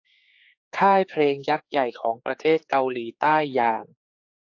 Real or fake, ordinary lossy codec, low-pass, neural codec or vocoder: fake; AAC, 48 kbps; 7.2 kHz; codec, 16 kHz, 4 kbps, X-Codec, HuBERT features, trained on general audio